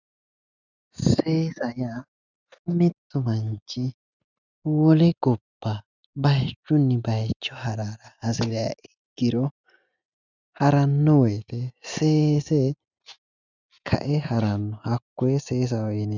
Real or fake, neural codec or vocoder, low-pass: real; none; 7.2 kHz